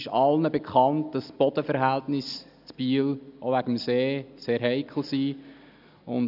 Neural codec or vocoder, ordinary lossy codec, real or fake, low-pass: none; none; real; 5.4 kHz